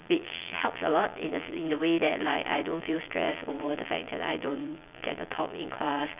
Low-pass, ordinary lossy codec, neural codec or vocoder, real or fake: 3.6 kHz; none; vocoder, 22.05 kHz, 80 mel bands, Vocos; fake